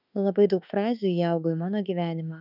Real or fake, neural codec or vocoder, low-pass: fake; autoencoder, 48 kHz, 32 numbers a frame, DAC-VAE, trained on Japanese speech; 5.4 kHz